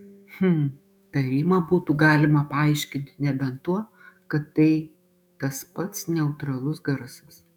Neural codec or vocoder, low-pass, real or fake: autoencoder, 48 kHz, 128 numbers a frame, DAC-VAE, trained on Japanese speech; 19.8 kHz; fake